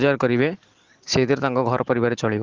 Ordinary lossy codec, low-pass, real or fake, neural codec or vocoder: Opus, 16 kbps; 7.2 kHz; real; none